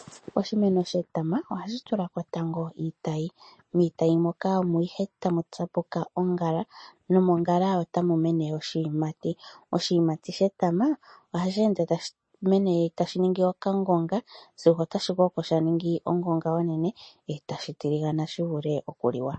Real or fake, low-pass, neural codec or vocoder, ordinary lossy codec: real; 9.9 kHz; none; MP3, 32 kbps